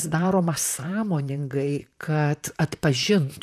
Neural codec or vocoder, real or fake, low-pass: vocoder, 44.1 kHz, 128 mel bands, Pupu-Vocoder; fake; 14.4 kHz